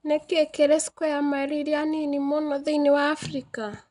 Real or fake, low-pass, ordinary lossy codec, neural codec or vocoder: real; 10.8 kHz; none; none